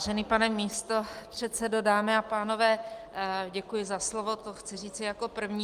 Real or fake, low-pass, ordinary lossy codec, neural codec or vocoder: real; 14.4 kHz; Opus, 32 kbps; none